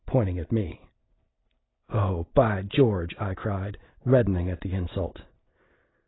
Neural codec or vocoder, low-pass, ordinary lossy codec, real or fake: none; 7.2 kHz; AAC, 16 kbps; real